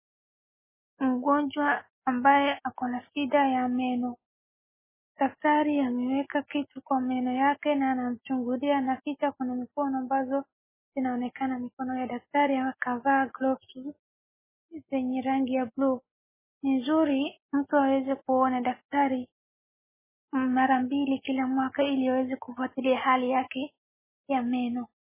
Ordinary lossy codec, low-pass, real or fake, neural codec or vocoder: MP3, 16 kbps; 3.6 kHz; real; none